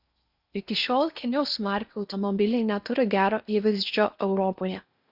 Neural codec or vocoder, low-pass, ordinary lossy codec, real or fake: codec, 16 kHz in and 24 kHz out, 0.8 kbps, FocalCodec, streaming, 65536 codes; 5.4 kHz; Opus, 64 kbps; fake